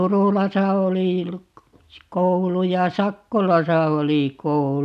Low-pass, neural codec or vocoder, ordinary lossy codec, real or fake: 14.4 kHz; none; none; real